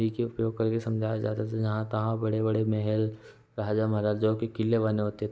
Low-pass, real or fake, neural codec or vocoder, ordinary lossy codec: none; real; none; none